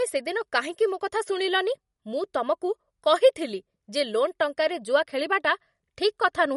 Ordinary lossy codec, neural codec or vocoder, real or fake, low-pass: MP3, 48 kbps; none; real; 19.8 kHz